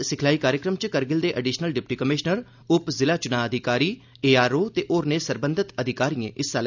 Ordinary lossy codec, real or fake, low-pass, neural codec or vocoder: none; real; 7.2 kHz; none